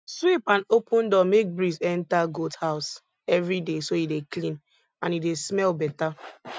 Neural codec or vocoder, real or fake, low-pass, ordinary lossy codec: none; real; none; none